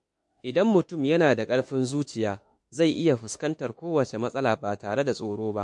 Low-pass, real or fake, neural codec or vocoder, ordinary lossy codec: 10.8 kHz; fake; autoencoder, 48 kHz, 32 numbers a frame, DAC-VAE, trained on Japanese speech; MP3, 48 kbps